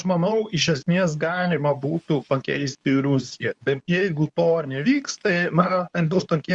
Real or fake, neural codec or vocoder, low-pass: fake; codec, 24 kHz, 0.9 kbps, WavTokenizer, medium speech release version 2; 10.8 kHz